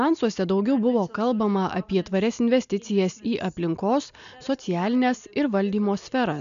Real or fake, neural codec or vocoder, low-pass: real; none; 7.2 kHz